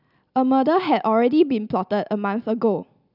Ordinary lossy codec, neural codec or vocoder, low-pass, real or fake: none; none; 5.4 kHz; real